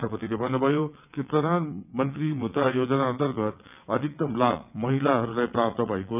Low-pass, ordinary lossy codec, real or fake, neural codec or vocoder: 3.6 kHz; none; fake; vocoder, 22.05 kHz, 80 mel bands, WaveNeXt